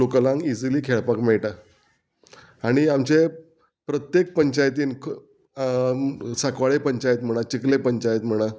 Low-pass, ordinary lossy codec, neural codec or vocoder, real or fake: none; none; none; real